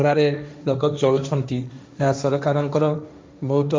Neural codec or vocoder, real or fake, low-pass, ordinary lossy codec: codec, 16 kHz, 1.1 kbps, Voila-Tokenizer; fake; none; none